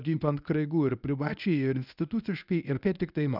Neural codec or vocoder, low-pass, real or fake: codec, 24 kHz, 0.9 kbps, WavTokenizer, medium speech release version 1; 5.4 kHz; fake